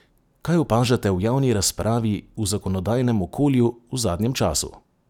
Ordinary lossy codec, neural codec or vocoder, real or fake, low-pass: none; none; real; 19.8 kHz